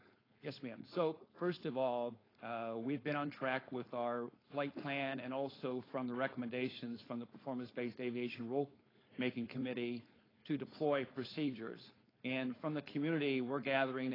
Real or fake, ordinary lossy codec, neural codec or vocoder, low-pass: fake; AAC, 24 kbps; codec, 16 kHz, 4.8 kbps, FACodec; 5.4 kHz